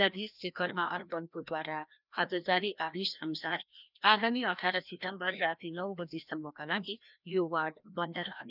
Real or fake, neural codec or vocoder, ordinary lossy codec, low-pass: fake; codec, 16 kHz, 1 kbps, FreqCodec, larger model; none; 5.4 kHz